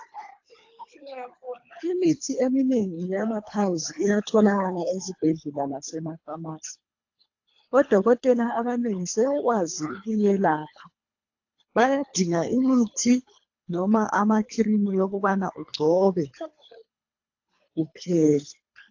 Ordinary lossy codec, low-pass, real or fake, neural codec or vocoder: AAC, 48 kbps; 7.2 kHz; fake; codec, 24 kHz, 3 kbps, HILCodec